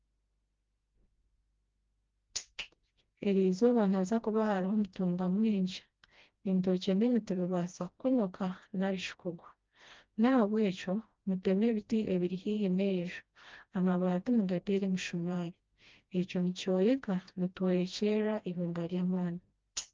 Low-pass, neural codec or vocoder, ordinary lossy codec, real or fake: 7.2 kHz; codec, 16 kHz, 1 kbps, FreqCodec, smaller model; Opus, 24 kbps; fake